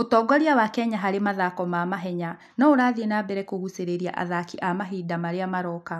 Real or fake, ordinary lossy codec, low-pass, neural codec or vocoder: real; none; 14.4 kHz; none